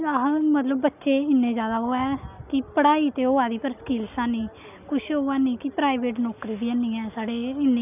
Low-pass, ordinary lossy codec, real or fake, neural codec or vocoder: 3.6 kHz; none; real; none